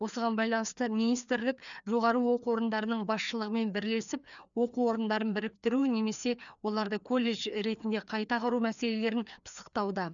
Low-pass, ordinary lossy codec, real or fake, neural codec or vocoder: 7.2 kHz; none; fake; codec, 16 kHz, 2 kbps, FreqCodec, larger model